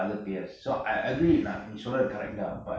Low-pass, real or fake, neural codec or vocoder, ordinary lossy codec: none; real; none; none